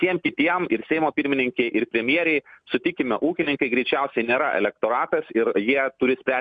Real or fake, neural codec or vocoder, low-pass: real; none; 9.9 kHz